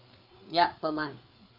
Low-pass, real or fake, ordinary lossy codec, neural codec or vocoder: 5.4 kHz; fake; none; codec, 16 kHz, 4 kbps, FreqCodec, larger model